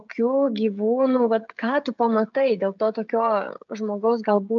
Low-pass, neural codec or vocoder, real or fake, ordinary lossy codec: 7.2 kHz; codec, 16 kHz, 16 kbps, FreqCodec, smaller model; fake; MP3, 64 kbps